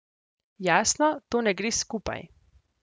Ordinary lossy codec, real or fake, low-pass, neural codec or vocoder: none; real; none; none